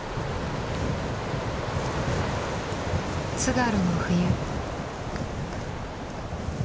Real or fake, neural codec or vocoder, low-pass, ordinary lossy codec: real; none; none; none